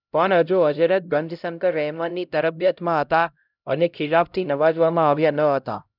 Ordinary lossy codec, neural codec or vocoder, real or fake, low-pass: none; codec, 16 kHz, 0.5 kbps, X-Codec, HuBERT features, trained on LibriSpeech; fake; 5.4 kHz